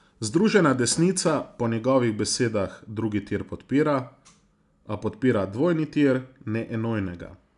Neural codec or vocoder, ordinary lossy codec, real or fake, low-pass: none; none; real; 10.8 kHz